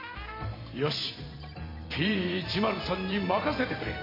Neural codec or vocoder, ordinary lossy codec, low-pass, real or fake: vocoder, 44.1 kHz, 128 mel bands every 512 samples, BigVGAN v2; MP3, 24 kbps; 5.4 kHz; fake